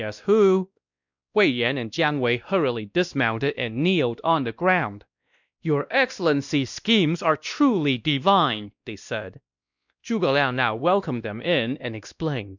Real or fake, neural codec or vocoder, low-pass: fake; codec, 16 kHz, 1 kbps, X-Codec, WavLM features, trained on Multilingual LibriSpeech; 7.2 kHz